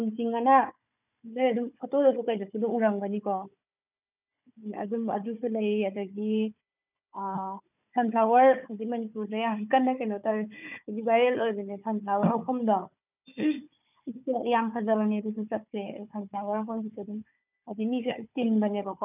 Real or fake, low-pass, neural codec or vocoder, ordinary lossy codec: fake; 3.6 kHz; codec, 16 kHz, 4 kbps, FunCodec, trained on Chinese and English, 50 frames a second; none